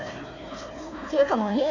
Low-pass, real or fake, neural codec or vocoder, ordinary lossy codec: 7.2 kHz; fake; codec, 16 kHz, 1 kbps, FunCodec, trained on Chinese and English, 50 frames a second; AAC, 48 kbps